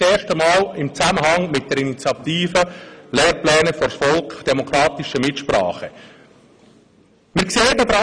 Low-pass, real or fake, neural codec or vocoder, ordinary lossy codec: 9.9 kHz; real; none; none